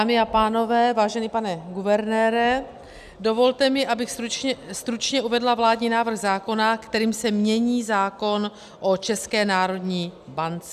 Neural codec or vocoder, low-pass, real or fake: none; 14.4 kHz; real